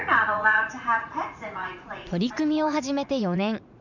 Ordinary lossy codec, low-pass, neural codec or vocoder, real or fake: none; 7.2 kHz; vocoder, 44.1 kHz, 80 mel bands, Vocos; fake